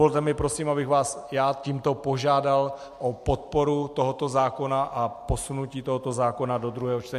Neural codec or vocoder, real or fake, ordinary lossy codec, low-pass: none; real; MP3, 64 kbps; 14.4 kHz